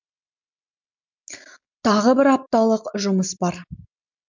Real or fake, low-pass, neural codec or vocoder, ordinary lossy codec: real; 7.2 kHz; none; MP3, 64 kbps